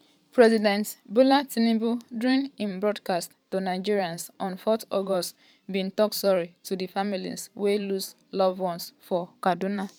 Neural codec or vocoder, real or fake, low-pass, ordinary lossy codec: vocoder, 44.1 kHz, 128 mel bands every 512 samples, BigVGAN v2; fake; 19.8 kHz; none